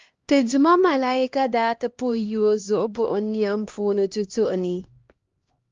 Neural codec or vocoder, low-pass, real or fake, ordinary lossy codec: codec, 16 kHz, 1 kbps, X-Codec, HuBERT features, trained on LibriSpeech; 7.2 kHz; fake; Opus, 32 kbps